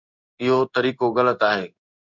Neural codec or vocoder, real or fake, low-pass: codec, 16 kHz in and 24 kHz out, 1 kbps, XY-Tokenizer; fake; 7.2 kHz